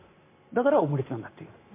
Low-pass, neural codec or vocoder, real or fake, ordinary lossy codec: 3.6 kHz; none; real; MP3, 24 kbps